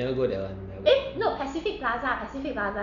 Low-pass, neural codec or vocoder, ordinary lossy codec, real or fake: 7.2 kHz; none; none; real